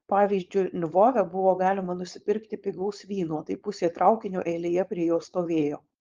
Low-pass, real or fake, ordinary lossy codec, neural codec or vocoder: 7.2 kHz; fake; Opus, 24 kbps; codec, 16 kHz, 4.8 kbps, FACodec